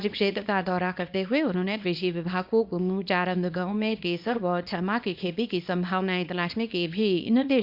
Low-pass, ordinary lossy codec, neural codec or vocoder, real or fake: 5.4 kHz; Opus, 64 kbps; codec, 24 kHz, 0.9 kbps, WavTokenizer, small release; fake